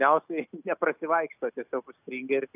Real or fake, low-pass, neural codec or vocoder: real; 3.6 kHz; none